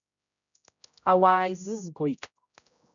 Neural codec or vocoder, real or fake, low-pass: codec, 16 kHz, 0.5 kbps, X-Codec, HuBERT features, trained on general audio; fake; 7.2 kHz